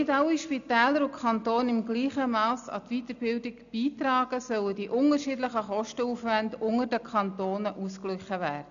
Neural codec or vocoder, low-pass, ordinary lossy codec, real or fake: none; 7.2 kHz; AAC, 96 kbps; real